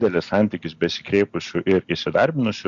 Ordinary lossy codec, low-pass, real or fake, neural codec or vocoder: Opus, 64 kbps; 7.2 kHz; real; none